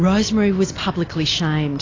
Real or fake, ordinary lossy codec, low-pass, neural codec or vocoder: real; AAC, 32 kbps; 7.2 kHz; none